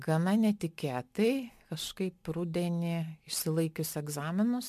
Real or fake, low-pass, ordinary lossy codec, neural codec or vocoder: real; 14.4 kHz; MP3, 96 kbps; none